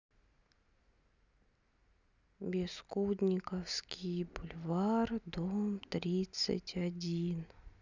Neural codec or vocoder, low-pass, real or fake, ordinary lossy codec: none; 7.2 kHz; real; none